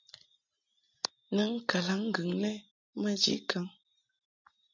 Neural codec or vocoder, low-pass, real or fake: none; 7.2 kHz; real